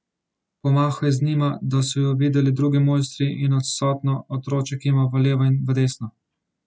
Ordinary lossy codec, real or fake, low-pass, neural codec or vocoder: none; real; none; none